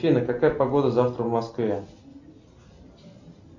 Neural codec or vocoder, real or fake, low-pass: none; real; 7.2 kHz